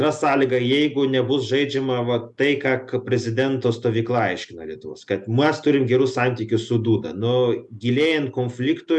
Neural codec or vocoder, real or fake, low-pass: none; real; 10.8 kHz